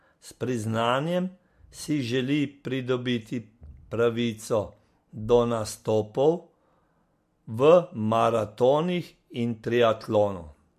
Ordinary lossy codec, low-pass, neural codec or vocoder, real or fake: MP3, 64 kbps; 14.4 kHz; none; real